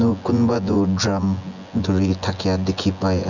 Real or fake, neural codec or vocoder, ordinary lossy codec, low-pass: fake; vocoder, 24 kHz, 100 mel bands, Vocos; none; 7.2 kHz